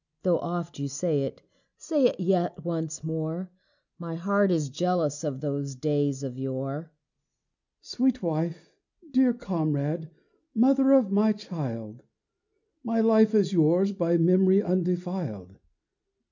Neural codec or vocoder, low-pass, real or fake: none; 7.2 kHz; real